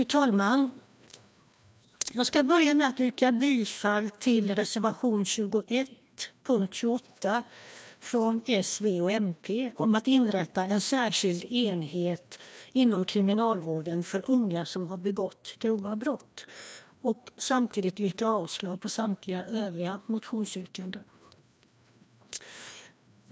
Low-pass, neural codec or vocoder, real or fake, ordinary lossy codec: none; codec, 16 kHz, 1 kbps, FreqCodec, larger model; fake; none